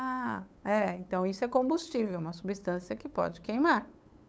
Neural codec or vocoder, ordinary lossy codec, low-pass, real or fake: codec, 16 kHz, 8 kbps, FunCodec, trained on LibriTTS, 25 frames a second; none; none; fake